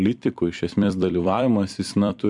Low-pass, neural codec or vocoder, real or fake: 10.8 kHz; vocoder, 44.1 kHz, 128 mel bands every 256 samples, BigVGAN v2; fake